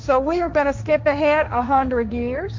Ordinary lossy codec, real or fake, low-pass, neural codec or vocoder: MP3, 64 kbps; fake; 7.2 kHz; codec, 16 kHz, 1.1 kbps, Voila-Tokenizer